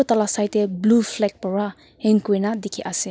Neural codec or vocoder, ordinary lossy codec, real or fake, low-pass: none; none; real; none